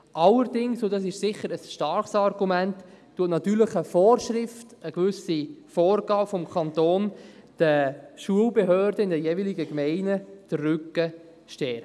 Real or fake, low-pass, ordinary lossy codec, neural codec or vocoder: real; none; none; none